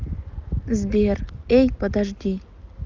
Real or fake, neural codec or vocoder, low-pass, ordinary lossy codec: real; none; 7.2 kHz; Opus, 24 kbps